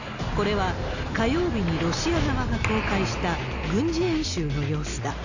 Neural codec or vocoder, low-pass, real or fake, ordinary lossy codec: none; 7.2 kHz; real; none